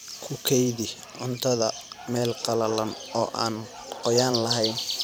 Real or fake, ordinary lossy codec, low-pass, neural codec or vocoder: fake; none; none; vocoder, 44.1 kHz, 128 mel bands every 512 samples, BigVGAN v2